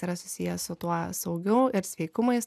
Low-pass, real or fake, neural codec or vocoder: 14.4 kHz; real; none